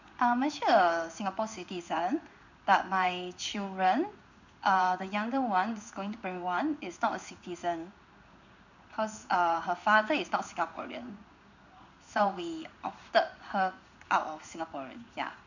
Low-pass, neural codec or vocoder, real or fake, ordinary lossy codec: 7.2 kHz; codec, 16 kHz in and 24 kHz out, 1 kbps, XY-Tokenizer; fake; none